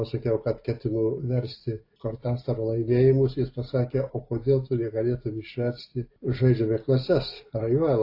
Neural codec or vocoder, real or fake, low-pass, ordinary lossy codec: none; real; 5.4 kHz; AAC, 32 kbps